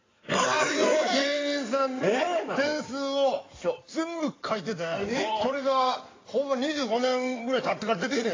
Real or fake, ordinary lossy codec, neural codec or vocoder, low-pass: fake; AAC, 32 kbps; codec, 16 kHz in and 24 kHz out, 2.2 kbps, FireRedTTS-2 codec; 7.2 kHz